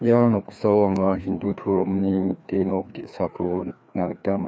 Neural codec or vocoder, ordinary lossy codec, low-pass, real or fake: codec, 16 kHz, 2 kbps, FreqCodec, larger model; none; none; fake